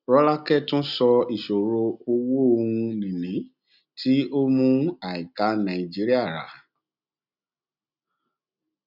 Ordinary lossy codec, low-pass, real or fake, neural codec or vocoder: none; 5.4 kHz; real; none